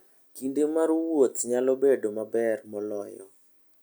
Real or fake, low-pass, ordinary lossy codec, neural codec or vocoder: real; none; none; none